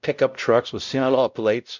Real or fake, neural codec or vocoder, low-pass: fake; codec, 16 kHz, 0.5 kbps, X-Codec, WavLM features, trained on Multilingual LibriSpeech; 7.2 kHz